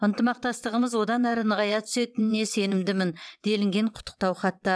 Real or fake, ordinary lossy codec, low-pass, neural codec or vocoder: fake; none; none; vocoder, 22.05 kHz, 80 mel bands, WaveNeXt